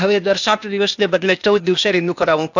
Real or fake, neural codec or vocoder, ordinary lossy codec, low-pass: fake; codec, 16 kHz, 0.8 kbps, ZipCodec; none; 7.2 kHz